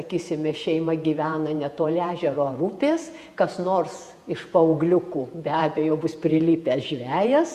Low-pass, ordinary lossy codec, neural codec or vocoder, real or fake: 14.4 kHz; Opus, 64 kbps; vocoder, 48 kHz, 128 mel bands, Vocos; fake